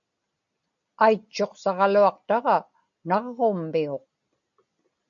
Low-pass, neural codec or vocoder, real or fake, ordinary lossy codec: 7.2 kHz; none; real; AAC, 64 kbps